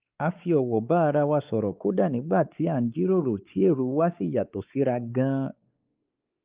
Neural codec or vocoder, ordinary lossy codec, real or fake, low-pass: codec, 16 kHz, 4 kbps, X-Codec, WavLM features, trained on Multilingual LibriSpeech; Opus, 32 kbps; fake; 3.6 kHz